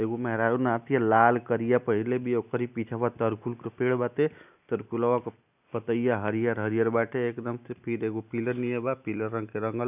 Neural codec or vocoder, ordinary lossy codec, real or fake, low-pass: none; none; real; 3.6 kHz